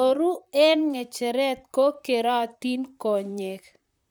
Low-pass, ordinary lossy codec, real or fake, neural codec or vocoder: none; none; fake; vocoder, 44.1 kHz, 128 mel bands, Pupu-Vocoder